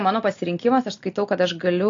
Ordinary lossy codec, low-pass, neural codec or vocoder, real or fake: AAC, 48 kbps; 7.2 kHz; none; real